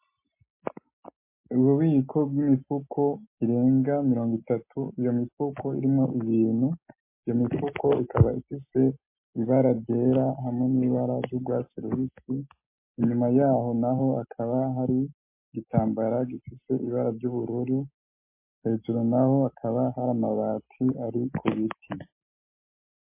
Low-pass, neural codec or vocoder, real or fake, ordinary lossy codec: 3.6 kHz; none; real; MP3, 24 kbps